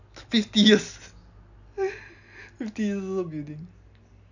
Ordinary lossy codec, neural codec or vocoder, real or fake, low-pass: none; none; real; 7.2 kHz